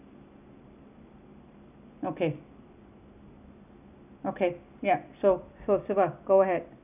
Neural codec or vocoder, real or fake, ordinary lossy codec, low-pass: none; real; none; 3.6 kHz